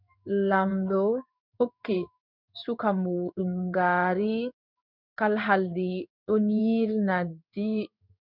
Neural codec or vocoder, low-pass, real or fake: codec, 16 kHz in and 24 kHz out, 1 kbps, XY-Tokenizer; 5.4 kHz; fake